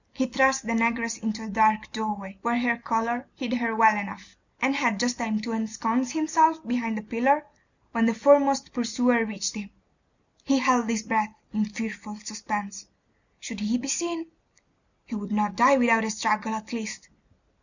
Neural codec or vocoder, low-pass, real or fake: none; 7.2 kHz; real